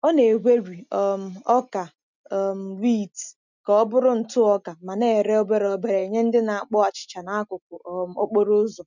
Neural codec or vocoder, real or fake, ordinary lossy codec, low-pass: none; real; none; 7.2 kHz